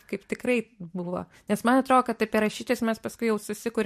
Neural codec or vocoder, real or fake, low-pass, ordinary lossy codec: none; real; 14.4 kHz; MP3, 64 kbps